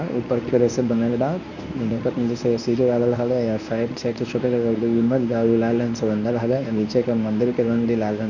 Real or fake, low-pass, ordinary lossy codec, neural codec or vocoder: fake; 7.2 kHz; none; codec, 24 kHz, 0.9 kbps, WavTokenizer, medium speech release version 1